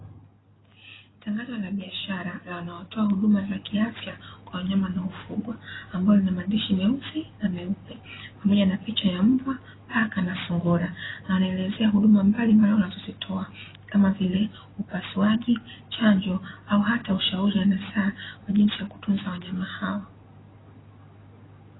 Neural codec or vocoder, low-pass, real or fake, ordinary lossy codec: none; 7.2 kHz; real; AAC, 16 kbps